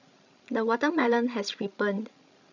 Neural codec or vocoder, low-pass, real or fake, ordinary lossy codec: codec, 16 kHz, 16 kbps, FreqCodec, larger model; 7.2 kHz; fake; none